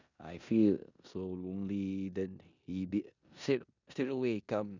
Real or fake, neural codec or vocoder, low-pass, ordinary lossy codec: fake; codec, 16 kHz in and 24 kHz out, 0.9 kbps, LongCat-Audio-Codec, four codebook decoder; 7.2 kHz; none